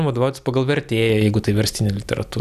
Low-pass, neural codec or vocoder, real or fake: 14.4 kHz; none; real